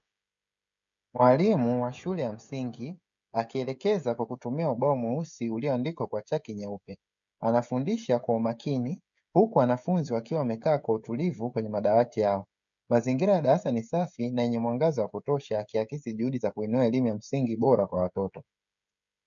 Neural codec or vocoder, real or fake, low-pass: codec, 16 kHz, 16 kbps, FreqCodec, smaller model; fake; 7.2 kHz